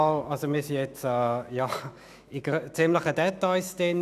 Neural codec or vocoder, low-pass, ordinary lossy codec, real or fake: autoencoder, 48 kHz, 128 numbers a frame, DAC-VAE, trained on Japanese speech; 14.4 kHz; none; fake